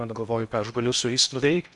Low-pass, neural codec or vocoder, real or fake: 10.8 kHz; codec, 16 kHz in and 24 kHz out, 0.8 kbps, FocalCodec, streaming, 65536 codes; fake